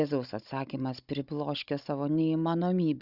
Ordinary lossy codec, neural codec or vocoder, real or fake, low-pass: Opus, 64 kbps; codec, 16 kHz, 16 kbps, FunCodec, trained on Chinese and English, 50 frames a second; fake; 5.4 kHz